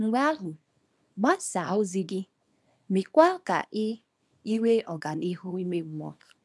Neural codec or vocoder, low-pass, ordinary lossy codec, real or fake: codec, 24 kHz, 0.9 kbps, WavTokenizer, small release; none; none; fake